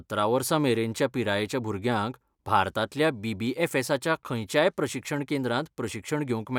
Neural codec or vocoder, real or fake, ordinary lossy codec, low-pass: none; real; none; 19.8 kHz